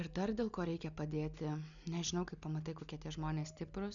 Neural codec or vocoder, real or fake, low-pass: none; real; 7.2 kHz